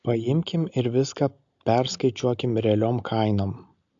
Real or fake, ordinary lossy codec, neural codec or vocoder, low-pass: real; AAC, 64 kbps; none; 7.2 kHz